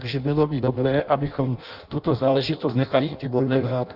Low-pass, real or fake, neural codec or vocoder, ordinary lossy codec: 5.4 kHz; fake; codec, 16 kHz in and 24 kHz out, 0.6 kbps, FireRedTTS-2 codec; Opus, 64 kbps